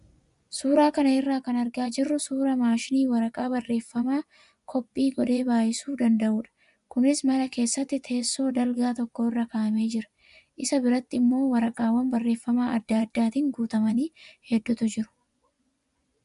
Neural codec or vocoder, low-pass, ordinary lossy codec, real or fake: vocoder, 24 kHz, 100 mel bands, Vocos; 10.8 kHz; MP3, 96 kbps; fake